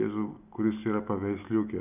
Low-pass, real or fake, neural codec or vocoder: 3.6 kHz; real; none